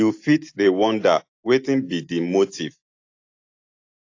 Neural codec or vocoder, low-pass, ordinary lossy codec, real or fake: none; 7.2 kHz; AAC, 48 kbps; real